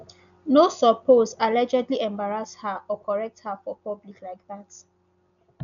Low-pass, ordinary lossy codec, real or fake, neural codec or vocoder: 7.2 kHz; none; real; none